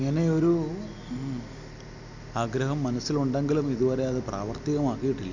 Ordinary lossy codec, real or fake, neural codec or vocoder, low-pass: none; real; none; 7.2 kHz